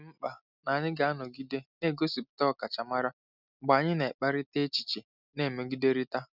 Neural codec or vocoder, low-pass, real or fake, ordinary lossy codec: none; 5.4 kHz; real; none